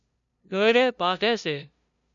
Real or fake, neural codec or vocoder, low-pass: fake; codec, 16 kHz, 0.5 kbps, FunCodec, trained on LibriTTS, 25 frames a second; 7.2 kHz